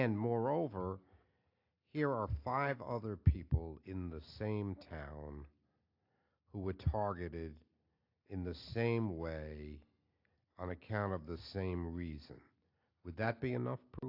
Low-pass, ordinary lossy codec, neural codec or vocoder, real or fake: 5.4 kHz; AAC, 32 kbps; none; real